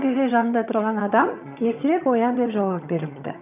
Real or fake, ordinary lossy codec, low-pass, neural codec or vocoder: fake; none; 3.6 kHz; vocoder, 22.05 kHz, 80 mel bands, HiFi-GAN